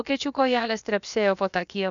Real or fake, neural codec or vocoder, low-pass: fake; codec, 16 kHz, about 1 kbps, DyCAST, with the encoder's durations; 7.2 kHz